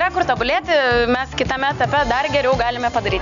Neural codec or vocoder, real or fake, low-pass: none; real; 7.2 kHz